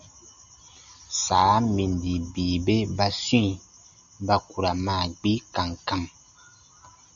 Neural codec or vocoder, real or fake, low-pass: none; real; 7.2 kHz